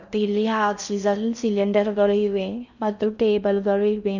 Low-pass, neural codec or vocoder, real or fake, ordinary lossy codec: 7.2 kHz; codec, 16 kHz in and 24 kHz out, 0.6 kbps, FocalCodec, streaming, 4096 codes; fake; none